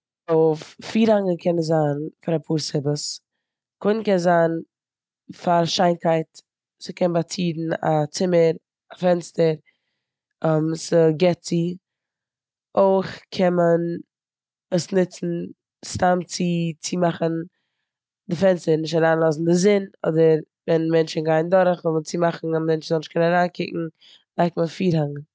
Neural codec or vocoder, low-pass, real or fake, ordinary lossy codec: none; none; real; none